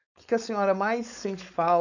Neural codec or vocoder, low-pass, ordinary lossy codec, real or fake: codec, 16 kHz, 4.8 kbps, FACodec; 7.2 kHz; none; fake